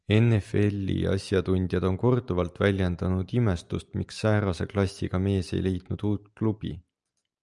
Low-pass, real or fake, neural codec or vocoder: 10.8 kHz; real; none